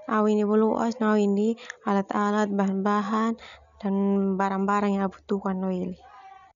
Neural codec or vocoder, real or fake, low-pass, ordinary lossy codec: none; real; 7.2 kHz; none